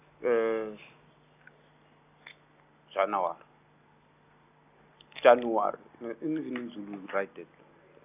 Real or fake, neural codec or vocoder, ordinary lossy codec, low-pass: real; none; none; 3.6 kHz